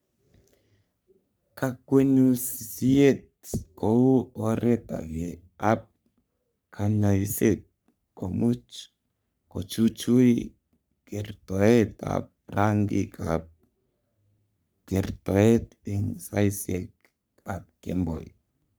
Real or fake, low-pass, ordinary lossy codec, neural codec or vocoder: fake; none; none; codec, 44.1 kHz, 3.4 kbps, Pupu-Codec